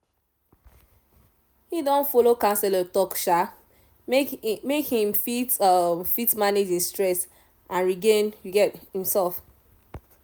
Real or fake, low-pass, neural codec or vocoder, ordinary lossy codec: real; none; none; none